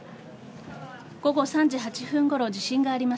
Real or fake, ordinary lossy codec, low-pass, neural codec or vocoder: real; none; none; none